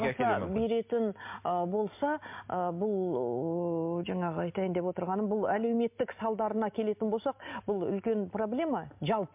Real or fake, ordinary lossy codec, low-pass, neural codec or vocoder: real; MP3, 32 kbps; 3.6 kHz; none